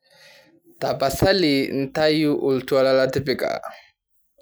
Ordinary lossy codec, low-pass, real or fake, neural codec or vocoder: none; none; real; none